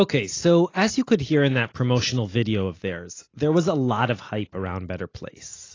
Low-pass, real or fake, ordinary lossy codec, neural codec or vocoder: 7.2 kHz; real; AAC, 32 kbps; none